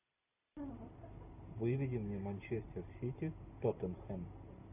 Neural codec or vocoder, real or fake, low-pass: none; real; 3.6 kHz